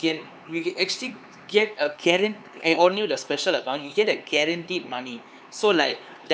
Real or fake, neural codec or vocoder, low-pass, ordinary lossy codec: fake; codec, 16 kHz, 4 kbps, X-Codec, HuBERT features, trained on LibriSpeech; none; none